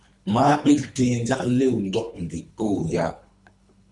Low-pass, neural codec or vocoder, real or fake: 10.8 kHz; codec, 24 kHz, 3 kbps, HILCodec; fake